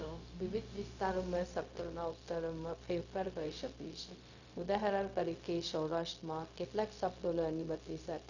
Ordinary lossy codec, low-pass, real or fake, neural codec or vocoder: none; 7.2 kHz; fake; codec, 16 kHz, 0.4 kbps, LongCat-Audio-Codec